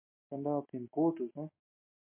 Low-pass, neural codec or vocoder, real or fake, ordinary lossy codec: 3.6 kHz; none; real; MP3, 32 kbps